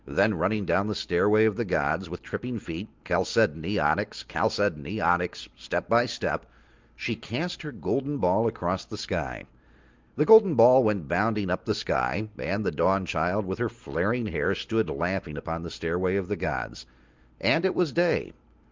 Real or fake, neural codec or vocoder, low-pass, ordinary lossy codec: real; none; 7.2 kHz; Opus, 32 kbps